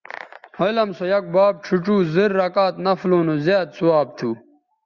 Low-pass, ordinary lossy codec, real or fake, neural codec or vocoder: 7.2 kHz; Opus, 64 kbps; real; none